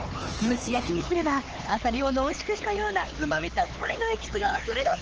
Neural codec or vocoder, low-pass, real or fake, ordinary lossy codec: codec, 16 kHz, 4 kbps, X-Codec, HuBERT features, trained on LibriSpeech; 7.2 kHz; fake; Opus, 16 kbps